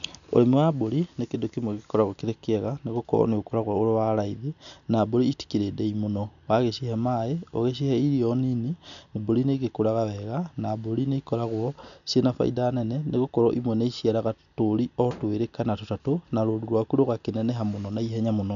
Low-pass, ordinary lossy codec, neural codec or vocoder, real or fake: 7.2 kHz; none; none; real